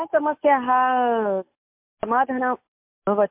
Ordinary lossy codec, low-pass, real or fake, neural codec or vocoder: MP3, 32 kbps; 3.6 kHz; real; none